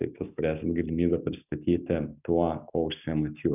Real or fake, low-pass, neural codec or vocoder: real; 3.6 kHz; none